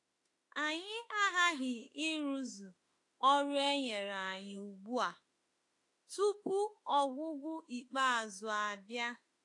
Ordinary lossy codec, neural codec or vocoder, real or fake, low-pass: AAC, 48 kbps; autoencoder, 48 kHz, 32 numbers a frame, DAC-VAE, trained on Japanese speech; fake; 10.8 kHz